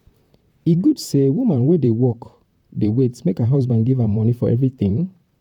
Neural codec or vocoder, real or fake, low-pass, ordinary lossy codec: vocoder, 44.1 kHz, 128 mel bands, Pupu-Vocoder; fake; 19.8 kHz; none